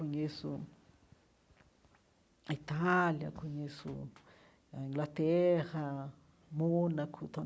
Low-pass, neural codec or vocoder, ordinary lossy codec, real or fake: none; none; none; real